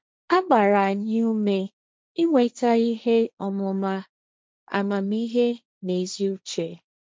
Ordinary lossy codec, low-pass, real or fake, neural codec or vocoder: none; none; fake; codec, 16 kHz, 1.1 kbps, Voila-Tokenizer